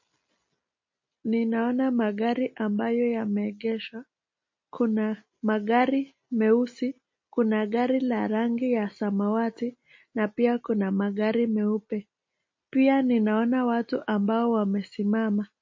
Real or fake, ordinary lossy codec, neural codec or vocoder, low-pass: real; MP3, 32 kbps; none; 7.2 kHz